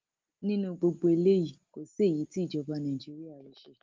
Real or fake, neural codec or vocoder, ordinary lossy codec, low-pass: real; none; Opus, 24 kbps; 7.2 kHz